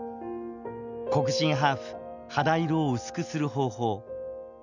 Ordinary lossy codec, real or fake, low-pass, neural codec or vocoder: none; real; 7.2 kHz; none